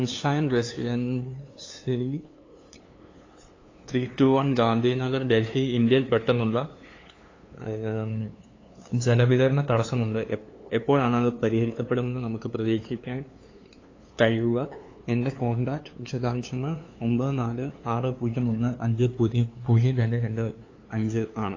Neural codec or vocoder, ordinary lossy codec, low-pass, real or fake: codec, 16 kHz, 2 kbps, FunCodec, trained on LibriTTS, 25 frames a second; AAC, 32 kbps; 7.2 kHz; fake